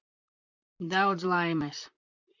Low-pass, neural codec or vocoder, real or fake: 7.2 kHz; vocoder, 44.1 kHz, 128 mel bands, Pupu-Vocoder; fake